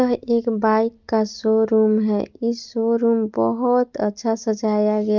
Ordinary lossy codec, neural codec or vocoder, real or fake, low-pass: Opus, 24 kbps; none; real; 7.2 kHz